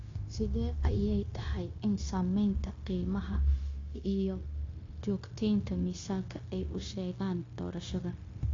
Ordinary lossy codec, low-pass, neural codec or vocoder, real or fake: AAC, 32 kbps; 7.2 kHz; codec, 16 kHz, 0.9 kbps, LongCat-Audio-Codec; fake